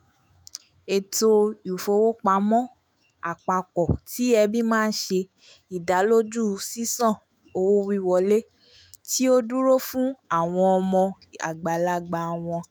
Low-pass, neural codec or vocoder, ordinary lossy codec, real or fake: none; autoencoder, 48 kHz, 128 numbers a frame, DAC-VAE, trained on Japanese speech; none; fake